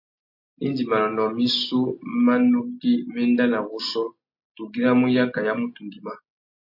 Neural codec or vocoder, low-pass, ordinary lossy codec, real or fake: none; 5.4 kHz; MP3, 32 kbps; real